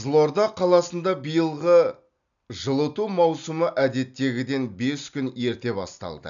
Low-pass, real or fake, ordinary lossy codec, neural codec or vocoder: 7.2 kHz; real; none; none